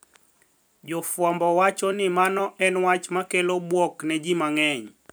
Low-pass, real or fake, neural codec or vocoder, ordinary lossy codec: none; fake; vocoder, 44.1 kHz, 128 mel bands every 256 samples, BigVGAN v2; none